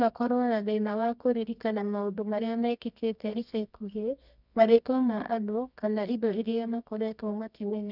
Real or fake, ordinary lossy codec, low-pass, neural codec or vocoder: fake; none; 5.4 kHz; codec, 24 kHz, 0.9 kbps, WavTokenizer, medium music audio release